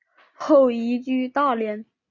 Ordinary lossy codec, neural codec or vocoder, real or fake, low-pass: MP3, 64 kbps; none; real; 7.2 kHz